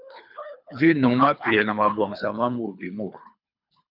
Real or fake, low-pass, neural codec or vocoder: fake; 5.4 kHz; codec, 24 kHz, 3 kbps, HILCodec